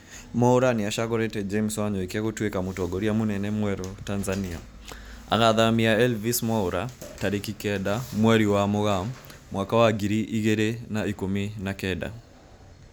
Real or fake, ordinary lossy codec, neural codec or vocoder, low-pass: real; none; none; none